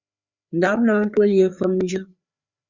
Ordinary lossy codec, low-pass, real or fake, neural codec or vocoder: Opus, 64 kbps; 7.2 kHz; fake; codec, 16 kHz, 4 kbps, FreqCodec, larger model